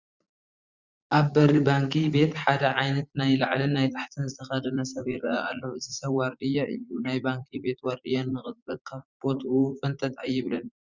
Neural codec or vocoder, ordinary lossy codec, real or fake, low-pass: vocoder, 44.1 kHz, 80 mel bands, Vocos; Opus, 64 kbps; fake; 7.2 kHz